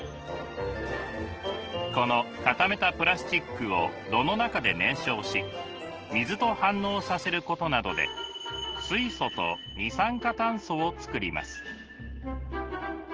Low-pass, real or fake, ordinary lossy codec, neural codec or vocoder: 7.2 kHz; real; Opus, 16 kbps; none